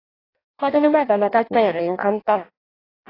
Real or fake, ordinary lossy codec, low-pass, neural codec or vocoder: fake; AAC, 24 kbps; 5.4 kHz; codec, 16 kHz in and 24 kHz out, 0.6 kbps, FireRedTTS-2 codec